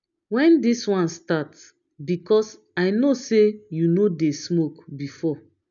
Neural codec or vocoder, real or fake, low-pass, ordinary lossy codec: none; real; 7.2 kHz; none